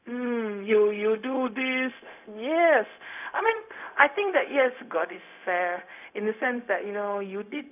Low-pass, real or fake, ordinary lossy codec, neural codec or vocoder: 3.6 kHz; fake; none; codec, 16 kHz, 0.4 kbps, LongCat-Audio-Codec